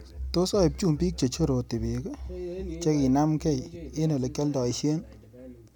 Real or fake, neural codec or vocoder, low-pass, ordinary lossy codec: real; none; 19.8 kHz; none